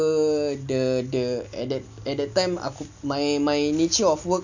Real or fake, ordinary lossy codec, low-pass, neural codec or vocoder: real; none; 7.2 kHz; none